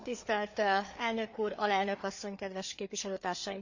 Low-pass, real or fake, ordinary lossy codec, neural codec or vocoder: 7.2 kHz; fake; none; codec, 16 kHz, 4 kbps, FreqCodec, larger model